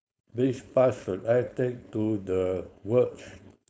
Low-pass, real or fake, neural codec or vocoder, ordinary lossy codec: none; fake; codec, 16 kHz, 4.8 kbps, FACodec; none